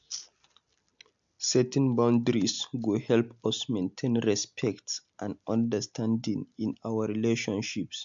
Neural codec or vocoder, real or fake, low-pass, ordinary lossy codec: none; real; 7.2 kHz; MP3, 64 kbps